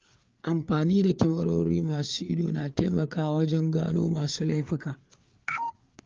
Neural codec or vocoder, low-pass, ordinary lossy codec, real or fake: codec, 16 kHz, 4 kbps, FreqCodec, larger model; 7.2 kHz; Opus, 24 kbps; fake